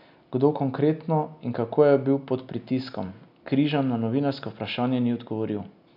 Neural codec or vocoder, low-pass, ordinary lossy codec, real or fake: none; 5.4 kHz; none; real